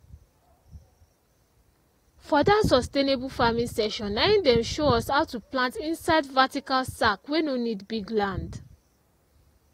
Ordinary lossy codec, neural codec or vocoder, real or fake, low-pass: AAC, 48 kbps; none; real; 19.8 kHz